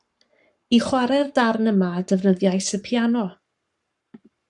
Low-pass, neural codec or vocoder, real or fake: 10.8 kHz; codec, 44.1 kHz, 7.8 kbps, Pupu-Codec; fake